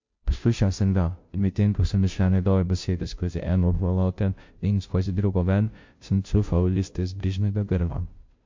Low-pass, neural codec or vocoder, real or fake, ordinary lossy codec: 7.2 kHz; codec, 16 kHz, 0.5 kbps, FunCodec, trained on Chinese and English, 25 frames a second; fake; MP3, 48 kbps